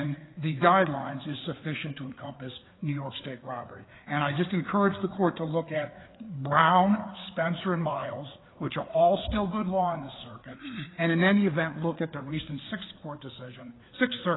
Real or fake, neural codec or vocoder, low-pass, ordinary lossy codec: fake; codec, 16 kHz, 4 kbps, FreqCodec, larger model; 7.2 kHz; AAC, 16 kbps